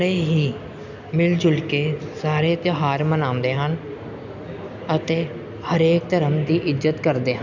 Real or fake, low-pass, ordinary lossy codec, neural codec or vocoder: real; 7.2 kHz; none; none